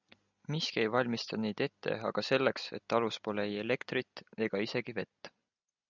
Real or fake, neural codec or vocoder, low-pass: real; none; 7.2 kHz